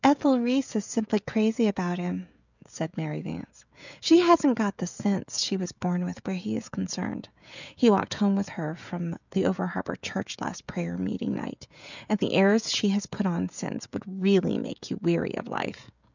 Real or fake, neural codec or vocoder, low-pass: fake; codec, 16 kHz, 16 kbps, FreqCodec, smaller model; 7.2 kHz